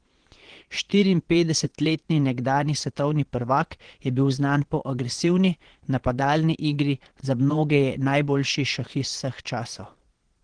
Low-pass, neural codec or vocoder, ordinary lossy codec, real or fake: 9.9 kHz; vocoder, 22.05 kHz, 80 mel bands, Vocos; Opus, 16 kbps; fake